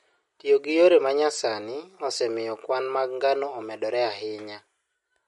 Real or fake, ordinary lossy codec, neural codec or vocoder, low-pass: real; MP3, 48 kbps; none; 19.8 kHz